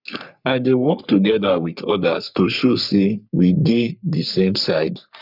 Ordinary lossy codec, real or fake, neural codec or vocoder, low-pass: none; fake; codec, 44.1 kHz, 3.4 kbps, Pupu-Codec; 5.4 kHz